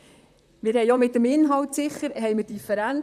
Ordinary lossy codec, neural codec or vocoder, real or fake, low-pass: none; vocoder, 44.1 kHz, 128 mel bands, Pupu-Vocoder; fake; 14.4 kHz